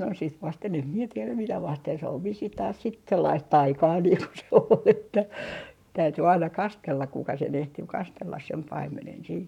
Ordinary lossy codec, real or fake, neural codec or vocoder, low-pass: none; fake; codec, 44.1 kHz, 7.8 kbps, Pupu-Codec; 19.8 kHz